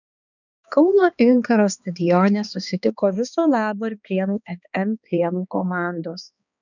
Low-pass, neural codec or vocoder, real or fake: 7.2 kHz; codec, 16 kHz, 2 kbps, X-Codec, HuBERT features, trained on balanced general audio; fake